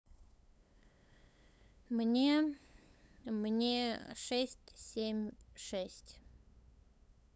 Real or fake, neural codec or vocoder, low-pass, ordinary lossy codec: fake; codec, 16 kHz, 8 kbps, FunCodec, trained on LibriTTS, 25 frames a second; none; none